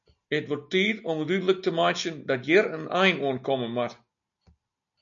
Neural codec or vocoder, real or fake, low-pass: none; real; 7.2 kHz